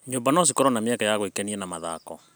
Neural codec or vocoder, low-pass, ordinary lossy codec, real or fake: none; none; none; real